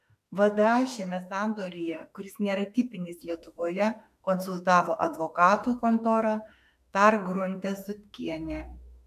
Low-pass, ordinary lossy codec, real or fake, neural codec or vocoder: 14.4 kHz; MP3, 96 kbps; fake; autoencoder, 48 kHz, 32 numbers a frame, DAC-VAE, trained on Japanese speech